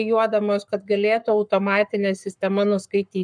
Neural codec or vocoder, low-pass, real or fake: codec, 44.1 kHz, 7.8 kbps, Pupu-Codec; 9.9 kHz; fake